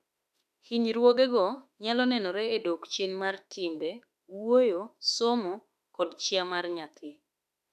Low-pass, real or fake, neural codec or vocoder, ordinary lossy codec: 14.4 kHz; fake; autoencoder, 48 kHz, 32 numbers a frame, DAC-VAE, trained on Japanese speech; none